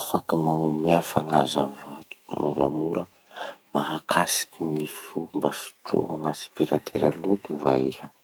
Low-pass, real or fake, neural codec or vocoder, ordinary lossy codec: none; fake; codec, 44.1 kHz, 2.6 kbps, SNAC; none